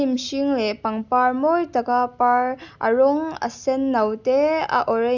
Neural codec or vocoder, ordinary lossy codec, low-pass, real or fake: none; none; 7.2 kHz; real